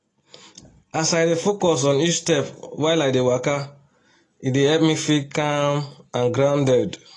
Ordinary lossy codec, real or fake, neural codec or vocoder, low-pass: AAC, 32 kbps; real; none; 10.8 kHz